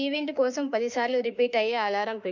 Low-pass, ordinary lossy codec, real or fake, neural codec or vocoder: 7.2 kHz; none; fake; autoencoder, 48 kHz, 32 numbers a frame, DAC-VAE, trained on Japanese speech